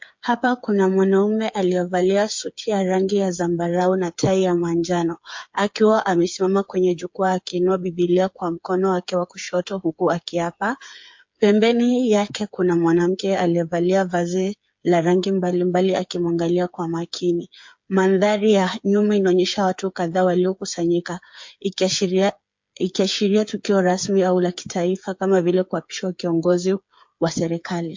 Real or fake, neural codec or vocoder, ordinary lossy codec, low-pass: fake; codec, 16 kHz, 8 kbps, FreqCodec, smaller model; MP3, 48 kbps; 7.2 kHz